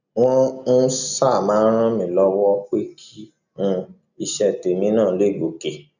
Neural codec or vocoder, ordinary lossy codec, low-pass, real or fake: none; none; 7.2 kHz; real